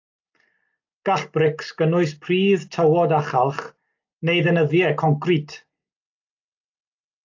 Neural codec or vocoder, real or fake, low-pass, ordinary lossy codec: none; real; 7.2 kHz; AAC, 48 kbps